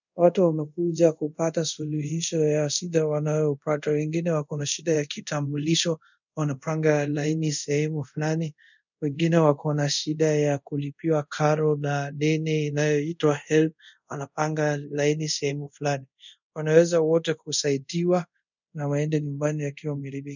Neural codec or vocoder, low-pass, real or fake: codec, 24 kHz, 0.5 kbps, DualCodec; 7.2 kHz; fake